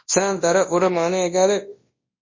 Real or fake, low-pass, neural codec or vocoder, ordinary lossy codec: fake; 7.2 kHz; codec, 24 kHz, 0.9 kbps, WavTokenizer, large speech release; MP3, 32 kbps